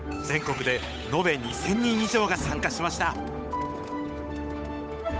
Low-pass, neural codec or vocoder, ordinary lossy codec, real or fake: none; codec, 16 kHz, 8 kbps, FunCodec, trained on Chinese and English, 25 frames a second; none; fake